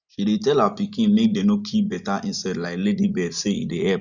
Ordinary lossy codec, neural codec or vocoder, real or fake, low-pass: none; none; real; 7.2 kHz